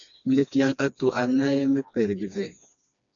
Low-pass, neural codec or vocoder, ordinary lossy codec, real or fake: 7.2 kHz; codec, 16 kHz, 2 kbps, FreqCodec, smaller model; MP3, 96 kbps; fake